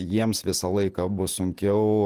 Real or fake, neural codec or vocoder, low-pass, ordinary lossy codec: fake; vocoder, 48 kHz, 128 mel bands, Vocos; 14.4 kHz; Opus, 24 kbps